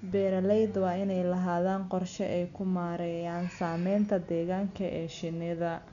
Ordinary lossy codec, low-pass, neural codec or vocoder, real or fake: none; 7.2 kHz; none; real